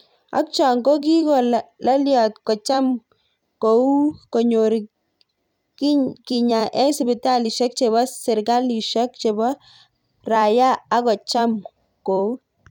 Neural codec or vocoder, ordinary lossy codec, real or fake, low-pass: vocoder, 44.1 kHz, 128 mel bands every 256 samples, BigVGAN v2; none; fake; 19.8 kHz